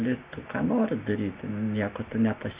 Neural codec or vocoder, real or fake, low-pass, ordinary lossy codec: none; real; 3.6 kHz; Opus, 64 kbps